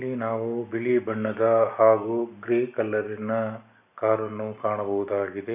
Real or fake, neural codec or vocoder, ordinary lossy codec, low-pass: real; none; none; 3.6 kHz